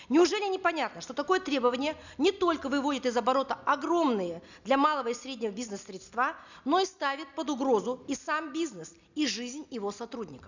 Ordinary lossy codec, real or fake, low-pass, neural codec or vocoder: none; real; 7.2 kHz; none